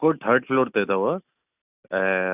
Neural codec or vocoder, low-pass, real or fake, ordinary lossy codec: none; 3.6 kHz; real; none